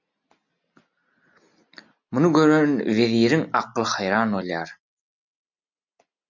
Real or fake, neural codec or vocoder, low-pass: real; none; 7.2 kHz